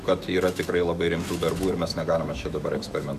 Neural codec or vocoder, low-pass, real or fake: autoencoder, 48 kHz, 128 numbers a frame, DAC-VAE, trained on Japanese speech; 14.4 kHz; fake